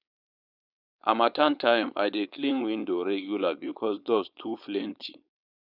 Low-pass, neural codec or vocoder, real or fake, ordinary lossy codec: 5.4 kHz; codec, 16 kHz, 4.8 kbps, FACodec; fake; none